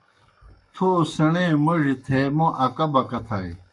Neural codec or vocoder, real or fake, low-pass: codec, 44.1 kHz, 7.8 kbps, Pupu-Codec; fake; 10.8 kHz